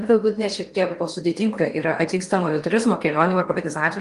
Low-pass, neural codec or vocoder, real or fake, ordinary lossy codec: 10.8 kHz; codec, 16 kHz in and 24 kHz out, 0.8 kbps, FocalCodec, streaming, 65536 codes; fake; Opus, 24 kbps